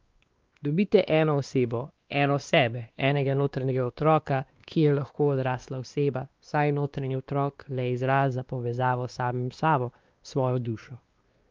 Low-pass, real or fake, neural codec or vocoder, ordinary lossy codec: 7.2 kHz; fake; codec, 16 kHz, 2 kbps, X-Codec, WavLM features, trained on Multilingual LibriSpeech; Opus, 32 kbps